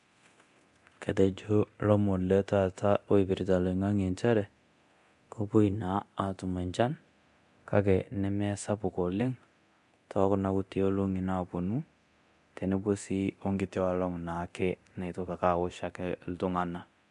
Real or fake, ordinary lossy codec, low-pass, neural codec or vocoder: fake; MP3, 64 kbps; 10.8 kHz; codec, 24 kHz, 0.9 kbps, DualCodec